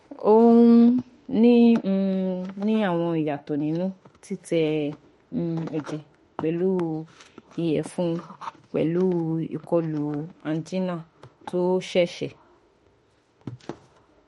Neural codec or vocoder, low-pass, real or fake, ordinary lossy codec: autoencoder, 48 kHz, 32 numbers a frame, DAC-VAE, trained on Japanese speech; 19.8 kHz; fake; MP3, 48 kbps